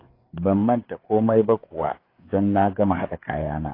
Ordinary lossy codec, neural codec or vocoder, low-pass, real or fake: AAC, 24 kbps; codec, 16 kHz, 4 kbps, FreqCodec, larger model; 5.4 kHz; fake